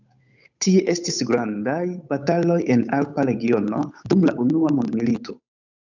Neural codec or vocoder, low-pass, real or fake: codec, 16 kHz, 8 kbps, FunCodec, trained on Chinese and English, 25 frames a second; 7.2 kHz; fake